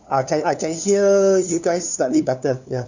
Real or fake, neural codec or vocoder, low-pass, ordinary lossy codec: fake; codec, 16 kHz, 2 kbps, FunCodec, trained on LibriTTS, 25 frames a second; 7.2 kHz; none